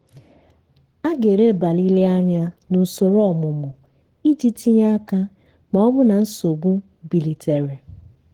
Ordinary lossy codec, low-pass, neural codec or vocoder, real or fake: Opus, 16 kbps; 19.8 kHz; codec, 44.1 kHz, 7.8 kbps, Pupu-Codec; fake